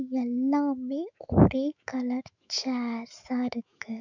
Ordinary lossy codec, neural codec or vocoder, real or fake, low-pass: none; autoencoder, 48 kHz, 128 numbers a frame, DAC-VAE, trained on Japanese speech; fake; 7.2 kHz